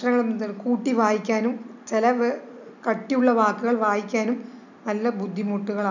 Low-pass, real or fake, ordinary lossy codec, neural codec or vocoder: 7.2 kHz; real; none; none